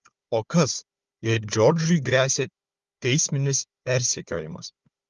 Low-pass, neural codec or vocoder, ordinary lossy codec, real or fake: 7.2 kHz; codec, 16 kHz, 4 kbps, FunCodec, trained on Chinese and English, 50 frames a second; Opus, 32 kbps; fake